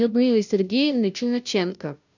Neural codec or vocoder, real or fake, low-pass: codec, 16 kHz, 0.5 kbps, FunCodec, trained on Chinese and English, 25 frames a second; fake; 7.2 kHz